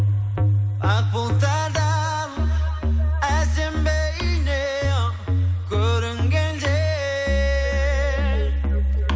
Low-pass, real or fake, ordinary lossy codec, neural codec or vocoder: none; real; none; none